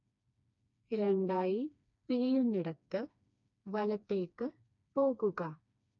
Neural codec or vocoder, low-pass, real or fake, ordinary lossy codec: codec, 16 kHz, 2 kbps, FreqCodec, smaller model; 7.2 kHz; fake; none